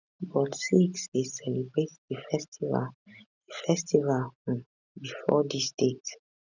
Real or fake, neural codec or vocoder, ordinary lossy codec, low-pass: real; none; none; 7.2 kHz